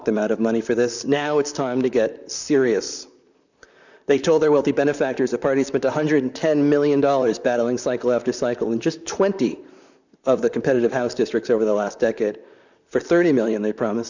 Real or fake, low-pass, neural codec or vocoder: fake; 7.2 kHz; vocoder, 44.1 kHz, 128 mel bands, Pupu-Vocoder